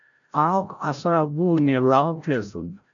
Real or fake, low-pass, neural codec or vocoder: fake; 7.2 kHz; codec, 16 kHz, 0.5 kbps, FreqCodec, larger model